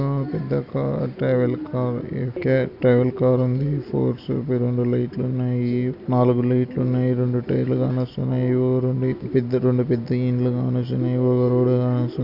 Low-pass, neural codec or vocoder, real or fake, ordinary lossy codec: 5.4 kHz; none; real; none